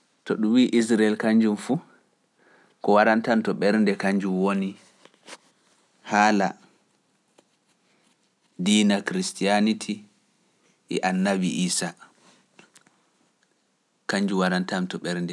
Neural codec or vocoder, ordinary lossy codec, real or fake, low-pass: none; none; real; none